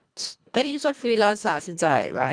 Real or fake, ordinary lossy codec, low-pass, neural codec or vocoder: fake; none; 9.9 kHz; codec, 24 kHz, 1.5 kbps, HILCodec